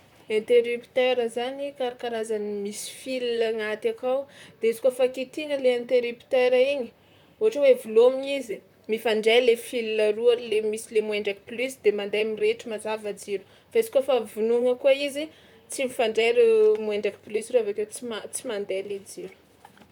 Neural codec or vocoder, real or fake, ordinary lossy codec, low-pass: vocoder, 44.1 kHz, 128 mel bands, Pupu-Vocoder; fake; none; 19.8 kHz